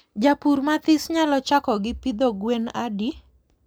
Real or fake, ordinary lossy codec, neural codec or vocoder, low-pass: real; none; none; none